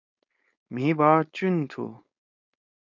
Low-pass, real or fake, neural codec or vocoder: 7.2 kHz; fake; codec, 16 kHz, 4.8 kbps, FACodec